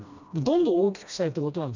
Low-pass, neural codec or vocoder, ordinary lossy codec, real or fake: 7.2 kHz; codec, 16 kHz, 1 kbps, FreqCodec, smaller model; none; fake